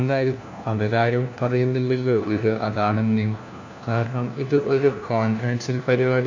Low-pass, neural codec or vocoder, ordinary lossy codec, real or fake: 7.2 kHz; codec, 16 kHz, 1 kbps, FunCodec, trained on LibriTTS, 50 frames a second; AAC, 48 kbps; fake